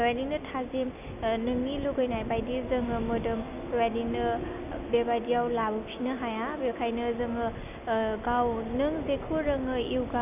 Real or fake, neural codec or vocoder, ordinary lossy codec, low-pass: real; none; none; 3.6 kHz